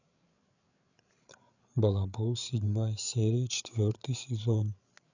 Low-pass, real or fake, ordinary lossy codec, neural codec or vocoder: 7.2 kHz; fake; none; codec, 16 kHz, 8 kbps, FreqCodec, larger model